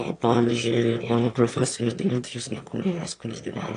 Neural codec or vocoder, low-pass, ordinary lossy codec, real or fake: autoencoder, 22.05 kHz, a latent of 192 numbers a frame, VITS, trained on one speaker; 9.9 kHz; AAC, 48 kbps; fake